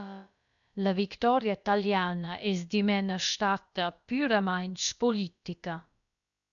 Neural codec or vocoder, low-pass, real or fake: codec, 16 kHz, about 1 kbps, DyCAST, with the encoder's durations; 7.2 kHz; fake